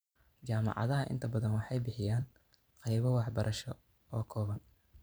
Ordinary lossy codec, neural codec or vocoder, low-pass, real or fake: none; vocoder, 44.1 kHz, 128 mel bands every 512 samples, BigVGAN v2; none; fake